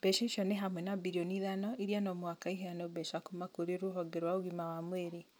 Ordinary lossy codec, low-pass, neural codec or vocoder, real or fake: none; none; none; real